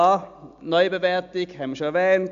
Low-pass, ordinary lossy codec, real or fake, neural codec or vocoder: 7.2 kHz; AAC, 64 kbps; real; none